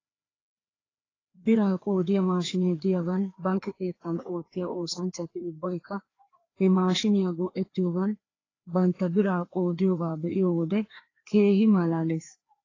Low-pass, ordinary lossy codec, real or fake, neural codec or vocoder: 7.2 kHz; AAC, 32 kbps; fake; codec, 16 kHz, 2 kbps, FreqCodec, larger model